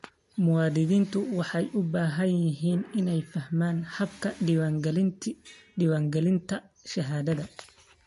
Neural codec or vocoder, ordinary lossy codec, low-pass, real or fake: none; MP3, 48 kbps; 14.4 kHz; real